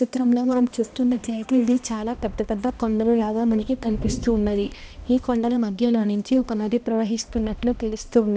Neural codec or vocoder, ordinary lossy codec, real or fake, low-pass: codec, 16 kHz, 1 kbps, X-Codec, HuBERT features, trained on balanced general audio; none; fake; none